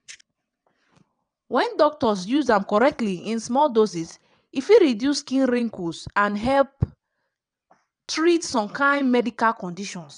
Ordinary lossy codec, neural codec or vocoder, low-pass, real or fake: none; vocoder, 22.05 kHz, 80 mel bands, WaveNeXt; 9.9 kHz; fake